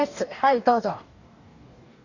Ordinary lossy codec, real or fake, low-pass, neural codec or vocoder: none; fake; 7.2 kHz; codec, 44.1 kHz, 2.6 kbps, DAC